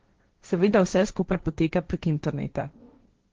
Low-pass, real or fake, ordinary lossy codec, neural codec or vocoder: 7.2 kHz; fake; Opus, 16 kbps; codec, 16 kHz, 1.1 kbps, Voila-Tokenizer